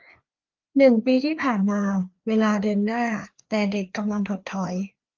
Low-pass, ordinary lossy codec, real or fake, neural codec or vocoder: 7.2 kHz; Opus, 32 kbps; fake; codec, 16 kHz, 2 kbps, FreqCodec, larger model